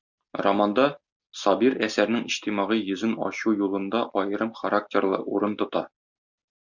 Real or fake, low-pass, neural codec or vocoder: real; 7.2 kHz; none